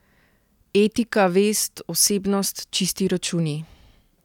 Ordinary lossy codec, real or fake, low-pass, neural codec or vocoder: none; real; 19.8 kHz; none